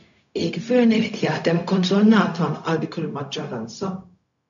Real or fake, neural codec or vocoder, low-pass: fake; codec, 16 kHz, 0.4 kbps, LongCat-Audio-Codec; 7.2 kHz